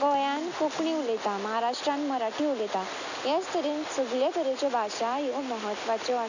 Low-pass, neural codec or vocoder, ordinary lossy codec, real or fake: 7.2 kHz; none; none; real